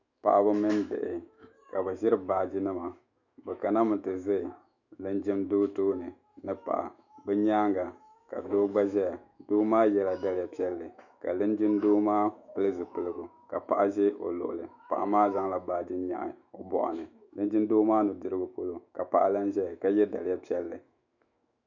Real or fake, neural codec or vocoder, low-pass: fake; autoencoder, 48 kHz, 128 numbers a frame, DAC-VAE, trained on Japanese speech; 7.2 kHz